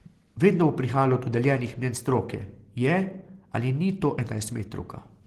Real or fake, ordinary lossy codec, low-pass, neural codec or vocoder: real; Opus, 16 kbps; 14.4 kHz; none